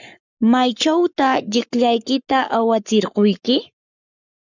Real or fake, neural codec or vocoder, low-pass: fake; codec, 44.1 kHz, 7.8 kbps, Pupu-Codec; 7.2 kHz